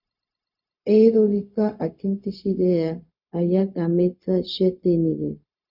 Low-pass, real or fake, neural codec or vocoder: 5.4 kHz; fake; codec, 16 kHz, 0.4 kbps, LongCat-Audio-Codec